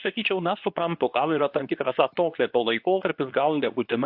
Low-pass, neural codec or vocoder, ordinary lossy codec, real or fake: 5.4 kHz; codec, 24 kHz, 0.9 kbps, WavTokenizer, medium speech release version 2; AAC, 48 kbps; fake